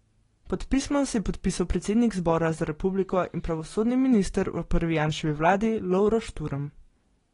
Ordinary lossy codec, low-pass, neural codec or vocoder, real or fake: AAC, 32 kbps; 10.8 kHz; none; real